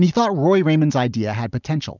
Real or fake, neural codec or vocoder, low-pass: real; none; 7.2 kHz